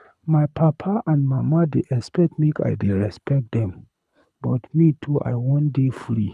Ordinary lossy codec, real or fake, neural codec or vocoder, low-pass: none; fake; codec, 24 kHz, 6 kbps, HILCodec; none